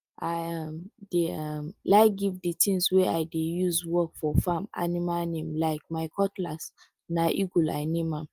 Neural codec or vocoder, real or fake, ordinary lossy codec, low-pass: none; real; Opus, 32 kbps; 14.4 kHz